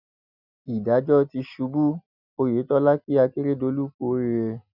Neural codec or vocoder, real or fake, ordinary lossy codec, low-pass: none; real; none; 5.4 kHz